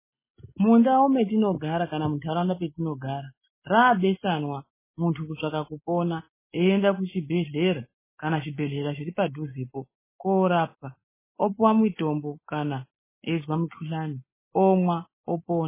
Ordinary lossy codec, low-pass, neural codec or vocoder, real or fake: MP3, 16 kbps; 3.6 kHz; none; real